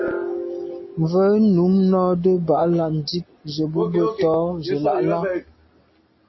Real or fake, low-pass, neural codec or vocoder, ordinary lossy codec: real; 7.2 kHz; none; MP3, 24 kbps